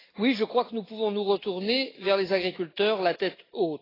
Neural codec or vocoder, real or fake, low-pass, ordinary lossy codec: none; real; 5.4 kHz; AAC, 24 kbps